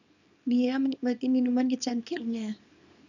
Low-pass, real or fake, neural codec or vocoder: 7.2 kHz; fake; codec, 24 kHz, 0.9 kbps, WavTokenizer, small release